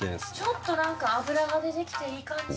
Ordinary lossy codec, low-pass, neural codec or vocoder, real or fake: none; none; none; real